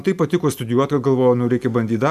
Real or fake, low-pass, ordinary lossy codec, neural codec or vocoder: real; 14.4 kHz; MP3, 96 kbps; none